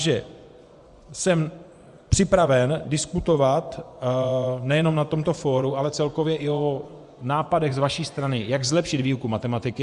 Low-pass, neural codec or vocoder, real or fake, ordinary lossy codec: 10.8 kHz; vocoder, 24 kHz, 100 mel bands, Vocos; fake; Opus, 64 kbps